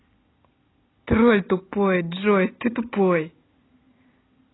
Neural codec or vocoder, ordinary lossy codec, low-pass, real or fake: none; AAC, 16 kbps; 7.2 kHz; real